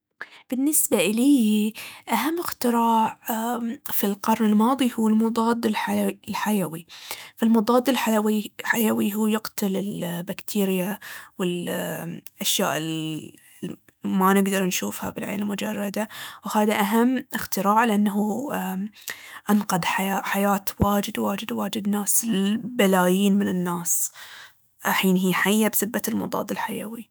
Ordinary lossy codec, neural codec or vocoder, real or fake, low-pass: none; autoencoder, 48 kHz, 128 numbers a frame, DAC-VAE, trained on Japanese speech; fake; none